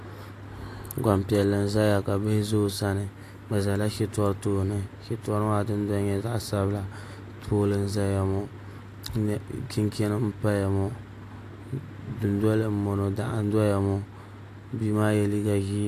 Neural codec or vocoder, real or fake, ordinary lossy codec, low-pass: none; real; AAC, 64 kbps; 14.4 kHz